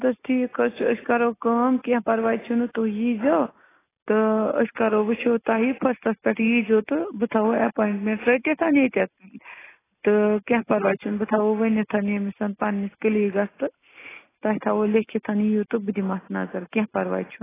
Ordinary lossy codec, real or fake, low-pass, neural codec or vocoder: AAC, 16 kbps; real; 3.6 kHz; none